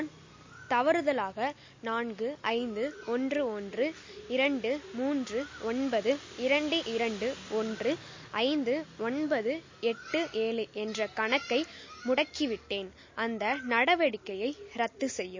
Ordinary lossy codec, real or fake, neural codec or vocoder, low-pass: MP3, 32 kbps; real; none; 7.2 kHz